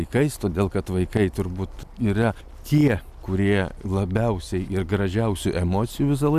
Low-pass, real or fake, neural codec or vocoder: 14.4 kHz; real; none